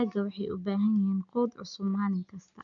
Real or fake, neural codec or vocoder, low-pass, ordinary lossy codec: real; none; 7.2 kHz; none